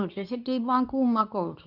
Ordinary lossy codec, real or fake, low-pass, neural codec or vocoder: none; fake; 5.4 kHz; codec, 24 kHz, 0.9 kbps, WavTokenizer, medium speech release version 2